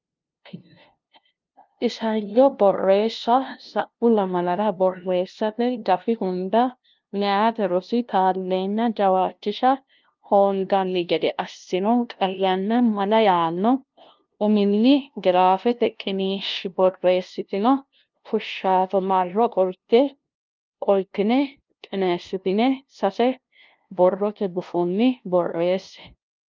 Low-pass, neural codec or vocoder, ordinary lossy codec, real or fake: 7.2 kHz; codec, 16 kHz, 0.5 kbps, FunCodec, trained on LibriTTS, 25 frames a second; Opus, 32 kbps; fake